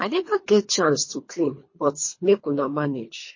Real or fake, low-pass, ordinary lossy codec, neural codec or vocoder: fake; 7.2 kHz; MP3, 32 kbps; codec, 24 kHz, 3 kbps, HILCodec